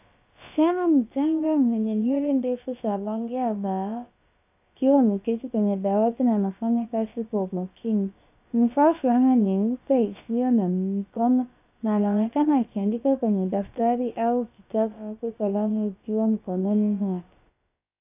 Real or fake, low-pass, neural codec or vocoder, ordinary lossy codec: fake; 3.6 kHz; codec, 16 kHz, about 1 kbps, DyCAST, with the encoder's durations; AAC, 32 kbps